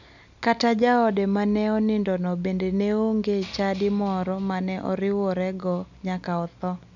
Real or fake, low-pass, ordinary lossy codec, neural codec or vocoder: real; 7.2 kHz; none; none